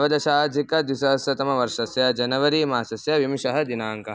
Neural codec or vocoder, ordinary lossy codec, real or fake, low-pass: none; none; real; none